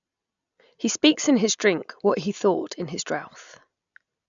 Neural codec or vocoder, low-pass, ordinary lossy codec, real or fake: none; 7.2 kHz; none; real